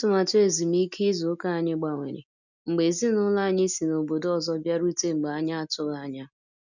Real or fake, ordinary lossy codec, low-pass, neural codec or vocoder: real; none; 7.2 kHz; none